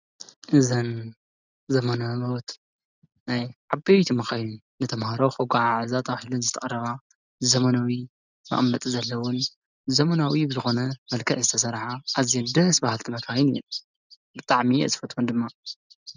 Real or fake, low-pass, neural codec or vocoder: real; 7.2 kHz; none